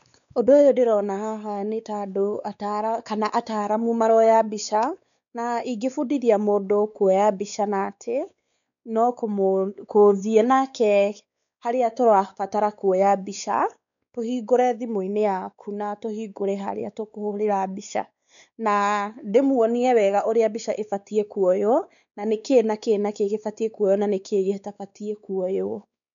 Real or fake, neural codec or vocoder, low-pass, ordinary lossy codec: fake; codec, 16 kHz, 4 kbps, X-Codec, WavLM features, trained on Multilingual LibriSpeech; 7.2 kHz; none